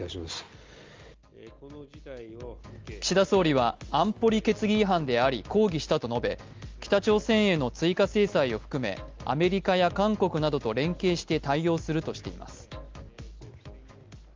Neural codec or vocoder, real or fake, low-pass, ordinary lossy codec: none; real; 7.2 kHz; Opus, 32 kbps